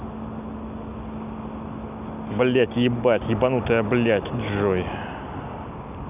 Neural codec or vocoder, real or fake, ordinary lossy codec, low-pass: none; real; none; 3.6 kHz